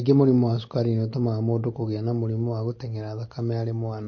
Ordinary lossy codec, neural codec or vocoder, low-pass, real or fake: MP3, 32 kbps; none; 7.2 kHz; real